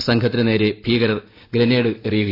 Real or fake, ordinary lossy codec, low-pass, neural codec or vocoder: real; AAC, 24 kbps; 5.4 kHz; none